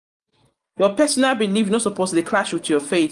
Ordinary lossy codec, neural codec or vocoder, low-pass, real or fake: Opus, 32 kbps; none; 10.8 kHz; real